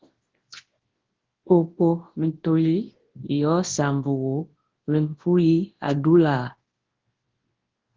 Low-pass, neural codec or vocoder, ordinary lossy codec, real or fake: 7.2 kHz; codec, 24 kHz, 0.9 kbps, WavTokenizer, large speech release; Opus, 16 kbps; fake